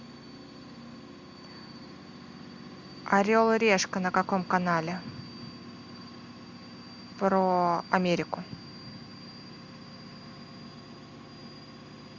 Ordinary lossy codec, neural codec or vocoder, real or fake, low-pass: MP3, 64 kbps; none; real; 7.2 kHz